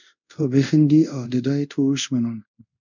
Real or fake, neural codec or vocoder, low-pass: fake; codec, 24 kHz, 0.5 kbps, DualCodec; 7.2 kHz